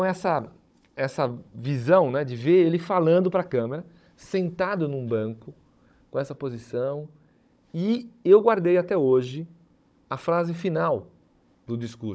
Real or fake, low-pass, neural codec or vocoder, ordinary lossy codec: fake; none; codec, 16 kHz, 16 kbps, FunCodec, trained on Chinese and English, 50 frames a second; none